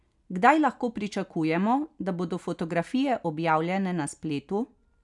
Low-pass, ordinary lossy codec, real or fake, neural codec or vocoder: 10.8 kHz; none; real; none